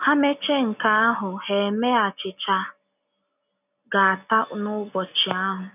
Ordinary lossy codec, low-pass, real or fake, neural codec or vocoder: none; 3.6 kHz; real; none